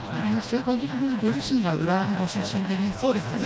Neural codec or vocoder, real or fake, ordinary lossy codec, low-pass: codec, 16 kHz, 1 kbps, FreqCodec, smaller model; fake; none; none